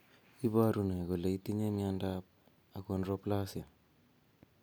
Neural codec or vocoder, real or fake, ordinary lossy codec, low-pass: none; real; none; none